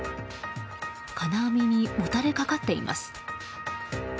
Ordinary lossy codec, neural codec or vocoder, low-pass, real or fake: none; none; none; real